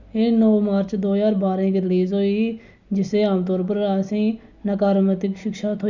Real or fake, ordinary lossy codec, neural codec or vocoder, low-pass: real; none; none; 7.2 kHz